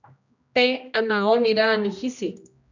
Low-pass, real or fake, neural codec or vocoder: 7.2 kHz; fake; codec, 16 kHz, 1 kbps, X-Codec, HuBERT features, trained on general audio